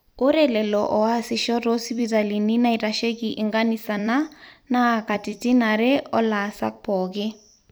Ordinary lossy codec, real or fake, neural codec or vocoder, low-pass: none; fake; vocoder, 44.1 kHz, 128 mel bands every 256 samples, BigVGAN v2; none